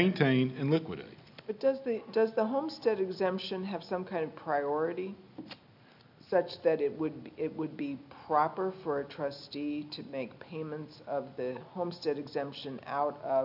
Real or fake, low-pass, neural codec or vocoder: real; 5.4 kHz; none